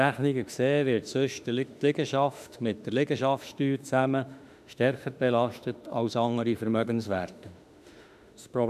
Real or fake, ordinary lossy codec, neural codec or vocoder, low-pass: fake; none; autoencoder, 48 kHz, 32 numbers a frame, DAC-VAE, trained on Japanese speech; 14.4 kHz